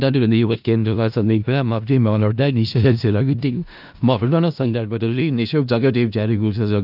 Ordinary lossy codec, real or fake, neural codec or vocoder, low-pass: AAC, 48 kbps; fake; codec, 16 kHz in and 24 kHz out, 0.4 kbps, LongCat-Audio-Codec, four codebook decoder; 5.4 kHz